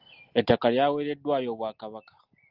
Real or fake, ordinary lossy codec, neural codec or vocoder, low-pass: real; Opus, 32 kbps; none; 5.4 kHz